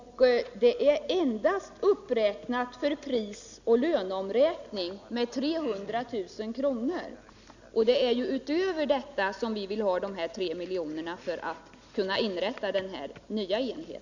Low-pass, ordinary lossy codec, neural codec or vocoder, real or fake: 7.2 kHz; none; none; real